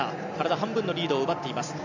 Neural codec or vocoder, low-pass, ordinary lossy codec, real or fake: none; 7.2 kHz; none; real